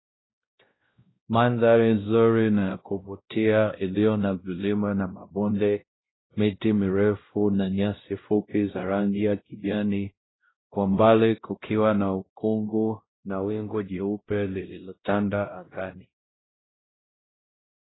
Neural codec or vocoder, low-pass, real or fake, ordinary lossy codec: codec, 16 kHz, 0.5 kbps, X-Codec, WavLM features, trained on Multilingual LibriSpeech; 7.2 kHz; fake; AAC, 16 kbps